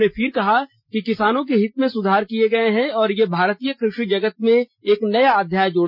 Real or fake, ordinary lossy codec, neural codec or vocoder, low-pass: real; none; none; 5.4 kHz